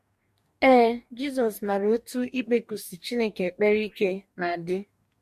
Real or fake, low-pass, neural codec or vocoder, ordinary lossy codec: fake; 14.4 kHz; codec, 44.1 kHz, 2.6 kbps, DAC; MP3, 64 kbps